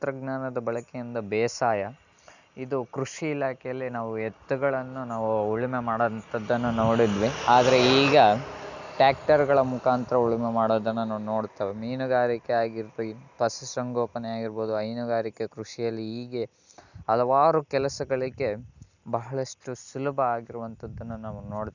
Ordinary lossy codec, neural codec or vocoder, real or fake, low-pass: none; none; real; 7.2 kHz